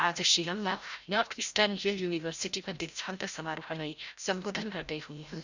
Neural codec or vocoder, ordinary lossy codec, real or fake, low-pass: codec, 16 kHz, 0.5 kbps, FreqCodec, larger model; Opus, 64 kbps; fake; 7.2 kHz